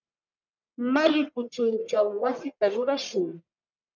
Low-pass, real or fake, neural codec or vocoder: 7.2 kHz; fake; codec, 44.1 kHz, 1.7 kbps, Pupu-Codec